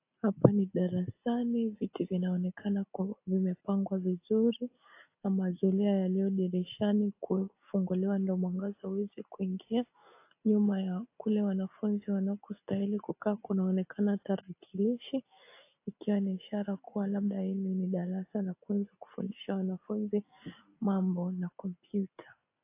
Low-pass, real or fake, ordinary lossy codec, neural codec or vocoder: 3.6 kHz; real; AAC, 24 kbps; none